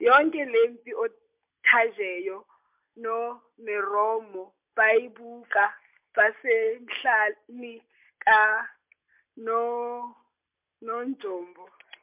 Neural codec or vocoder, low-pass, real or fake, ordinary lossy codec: none; 3.6 kHz; real; MP3, 32 kbps